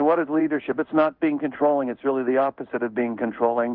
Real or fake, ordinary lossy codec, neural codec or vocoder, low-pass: fake; Opus, 24 kbps; codec, 16 kHz in and 24 kHz out, 1 kbps, XY-Tokenizer; 5.4 kHz